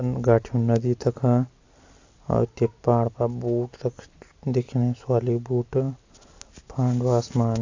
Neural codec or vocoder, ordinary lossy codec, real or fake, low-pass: none; AAC, 48 kbps; real; 7.2 kHz